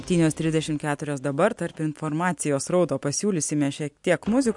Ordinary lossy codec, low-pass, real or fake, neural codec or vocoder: MP3, 64 kbps; 10.8 kHz; real; none